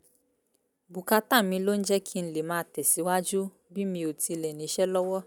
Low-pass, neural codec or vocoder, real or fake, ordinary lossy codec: none; none; real; none